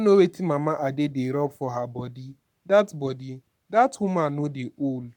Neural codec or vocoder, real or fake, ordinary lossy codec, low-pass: codec, 44.1 kHz, 7.8 kbps, Pupu-Codec; fake; none; 19.8 kHz